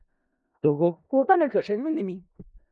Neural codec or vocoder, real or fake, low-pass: codec, 16 kHz in and 24 kHz out, 0.4 kbps, LongCat-Audio-Codec, four codebook decoder; fake; 10.8 kHz